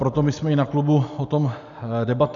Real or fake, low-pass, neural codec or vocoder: real; 7.2 kHz; none